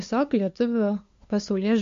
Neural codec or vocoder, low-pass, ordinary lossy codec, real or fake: codec, 16 kHz, 2 kbps, FunCodec, trained on LibriTTS, 25 frames a second; 7.2 kHz; MP3, 48 kbps; fake